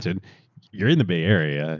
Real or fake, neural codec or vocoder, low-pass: real; none; 7.2 kHz